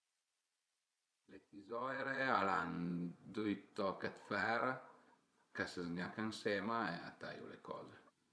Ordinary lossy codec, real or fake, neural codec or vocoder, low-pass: none; fake; vocoder, 22.05 kHz, 80 mel bands, Vocos; none